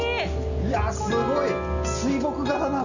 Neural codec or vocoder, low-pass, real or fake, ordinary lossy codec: none; 7.2 kHz; real; none